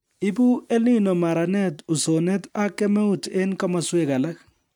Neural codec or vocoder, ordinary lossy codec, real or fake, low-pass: none; MP3, 96 kbps; real; 19.8 kHz